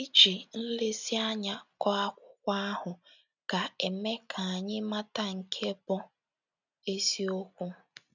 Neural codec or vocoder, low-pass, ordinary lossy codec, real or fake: none; 7.2 kHz; none; real